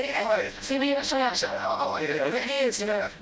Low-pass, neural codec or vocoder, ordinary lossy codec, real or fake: none; codec, 16 kHz, 0.5 kbps, FreqCodec, smaller model; none; fake